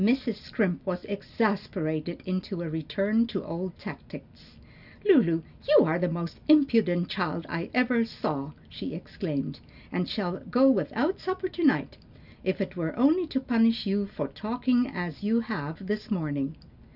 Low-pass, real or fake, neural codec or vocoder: 5.4 kHz; real; none